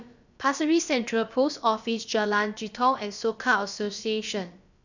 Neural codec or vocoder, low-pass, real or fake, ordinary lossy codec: codec, 16 kHz, about 1 kbps, DyCAST, with the encoder's durations; 7.2 kHz; fake; none